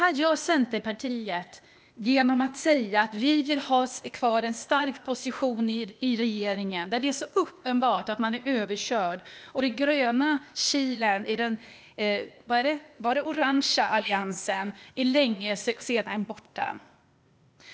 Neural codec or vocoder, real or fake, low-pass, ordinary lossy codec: codec, 16 kHz, 0.8 kbps, ZipCodec; fake; none; none